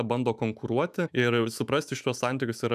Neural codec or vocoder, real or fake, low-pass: autoencoder, 48 kHz, 128 numbers a frame, DAC-VAE, trained on Japanese speech; fake; 14.4 kHz